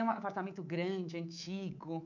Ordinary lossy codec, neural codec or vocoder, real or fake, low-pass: none; codec, 24 kHz, 3.1 kbps, DualCodec; fake; 7.2 kHz